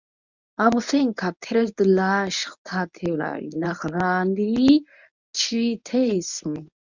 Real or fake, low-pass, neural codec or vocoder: fake; 7.2 kHz; codec, 24 kHz, 0.9 kbps, WavTokenizer, medium speech release version 1